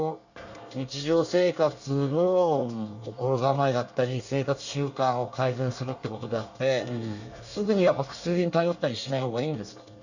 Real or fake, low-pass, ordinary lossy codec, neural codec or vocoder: fake; 7.2 kHz; none; codec, 24 kHz, 1 kbps, SNAC